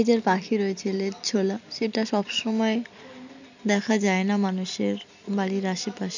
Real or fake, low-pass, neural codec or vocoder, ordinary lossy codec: real; 7.2 kHz; none; none